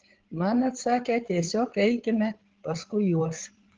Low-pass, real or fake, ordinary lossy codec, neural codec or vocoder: 7.2 kHz; fake; Opus, 16 kbps; codec, 16 kHz, 8 kbps, FreqCodec, larger model